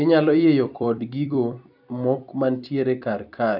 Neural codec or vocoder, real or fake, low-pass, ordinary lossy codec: vocoder, 44.1 kHz, 128 mel bands every 256 samples, BigVGAN v2; fake; 5.4 kHz; none